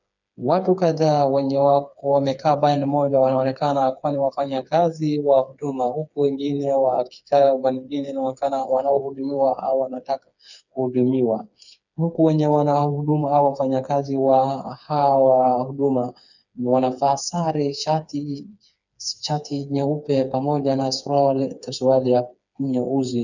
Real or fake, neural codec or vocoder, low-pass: fake; codec, 16 kHz, 4 kbps, FreqCodec, smaller model; 7.2 kHz